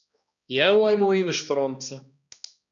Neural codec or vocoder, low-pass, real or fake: codec, 16 kHz, 1 kbps, X-Codec, HuBERT features, trained on balanced general audio; 7.2 kHz; fake